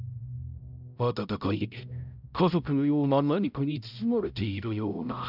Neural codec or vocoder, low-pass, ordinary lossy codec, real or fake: codec, 16 kHz, 0.5 kbps, X-Codec, HuBERT features, trained on balanced general audio; 5.4 kHz; none; fake